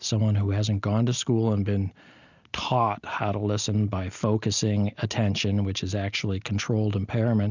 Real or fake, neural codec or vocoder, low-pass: real; none; 7.2 kHz